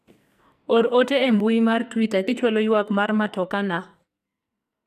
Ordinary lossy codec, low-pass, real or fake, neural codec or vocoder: none; 14.4 kHz; fake; codec, 44.1 kHz, 2.6 kbps, SNAC